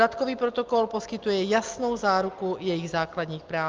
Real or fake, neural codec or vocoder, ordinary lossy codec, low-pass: real; none; Opus, 32 kbps; 7.2 kHz